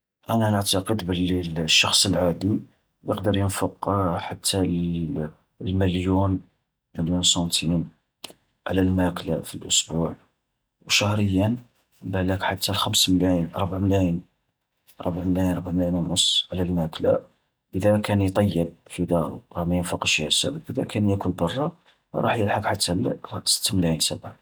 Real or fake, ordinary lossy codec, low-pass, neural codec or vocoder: real; none; none; none